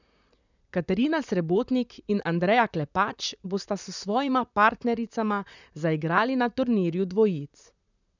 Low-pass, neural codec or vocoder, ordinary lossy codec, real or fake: 7.2 kHz; vocoder, 44.1 kHz, 128 mel bands, Pupu-Vocoder; none; fake